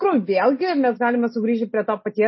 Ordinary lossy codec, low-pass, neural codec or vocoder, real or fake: MP3, 24 kbps; 7.2 kHz; none; real